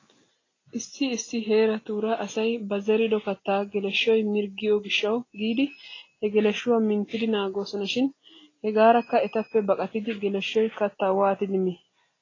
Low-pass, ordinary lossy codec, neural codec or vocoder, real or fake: 7.2 kHz; AAC, 32 kbps; none; real